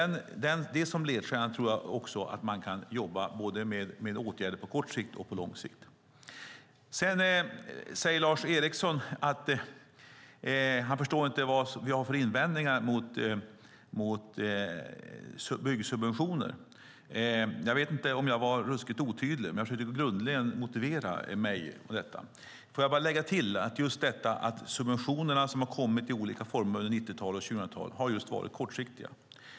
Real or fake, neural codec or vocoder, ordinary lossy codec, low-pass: real; none; none; none